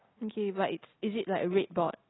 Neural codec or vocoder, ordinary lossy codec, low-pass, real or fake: none; AAC, 16 kbps; 7.2 kHz; real